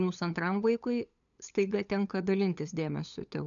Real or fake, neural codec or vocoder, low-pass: fake; codec, 16 kHz, 8 kbps, FunCodec, trained on LibriTTS, 25 frames a second; 7.2 kHz